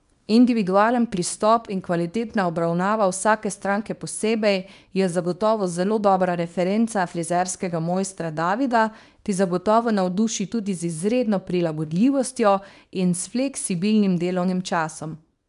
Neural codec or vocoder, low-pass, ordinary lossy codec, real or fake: codec, 24 kHz, 0.9 kbps, WavTokenizer, small release; 10.8 kHz; none; fake